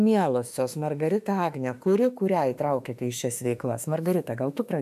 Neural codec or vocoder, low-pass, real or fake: autoencoder, 48 kHz, 32 numbers a frame, DAC-VAE, trained on Japanese speech; 14.4 kHz; fake